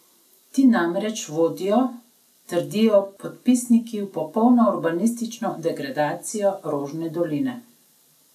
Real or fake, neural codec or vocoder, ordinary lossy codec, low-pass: real; none; none; 14.4 kHz